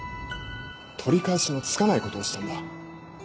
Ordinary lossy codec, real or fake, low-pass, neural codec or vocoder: none; real; none; none